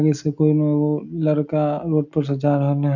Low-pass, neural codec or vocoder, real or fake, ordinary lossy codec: 7.2 kHz; none; real; none